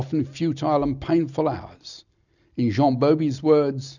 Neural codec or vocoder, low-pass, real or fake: none; 7.2 kHz; real